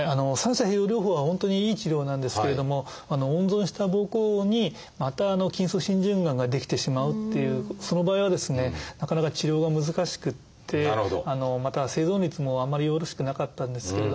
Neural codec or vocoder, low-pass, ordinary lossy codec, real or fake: none; none; none; real